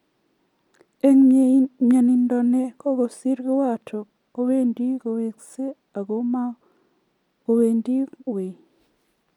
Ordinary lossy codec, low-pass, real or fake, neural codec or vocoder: MP3, 96 kbps; 19.8 kHz; real; none